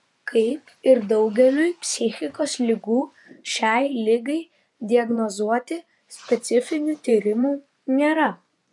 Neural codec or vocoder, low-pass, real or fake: vocoder, 24 kHz, 100 mel bands, Vocos; 10.8 kHz; fake